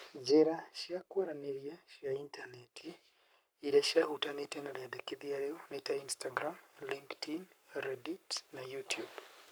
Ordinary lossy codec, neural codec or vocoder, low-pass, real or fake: none; codec, 44.1 kHz, 7.8 kbps, Pupu-Codec; none; fake